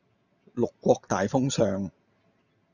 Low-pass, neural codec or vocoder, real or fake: 7.2 kHz; none; real